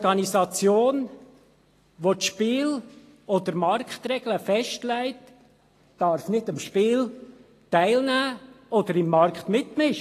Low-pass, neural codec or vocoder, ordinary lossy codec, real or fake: 14.4 kHz; none; AAC, 48 kbps; real